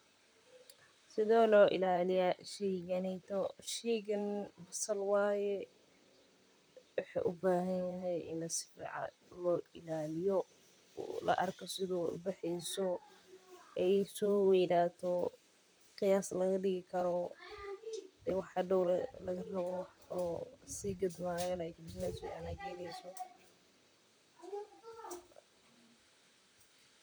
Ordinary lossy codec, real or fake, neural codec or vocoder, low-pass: none; fake; vocoder, 44.1 kHz, 128 mel bands, Pupu-Vocoder; none